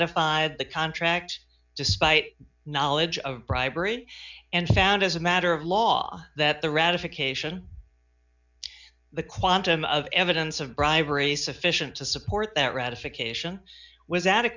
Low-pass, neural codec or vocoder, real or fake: 7.2 kHz; none; real